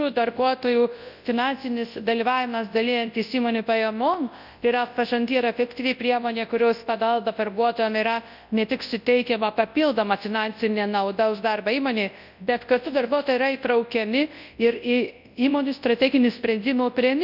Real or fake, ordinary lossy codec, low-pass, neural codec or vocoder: fake; none; 5.4 kHz; codec, 24 kHz, 0.9 kbps, WavTokenizer, large speech release